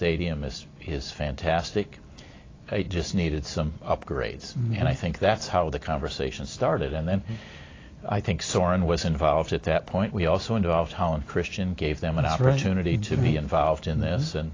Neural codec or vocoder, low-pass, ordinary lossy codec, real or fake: none; 7.2 kHz; AAC, 32 kbps; real